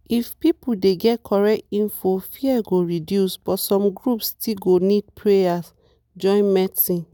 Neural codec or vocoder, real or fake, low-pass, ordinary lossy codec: none; real; none; none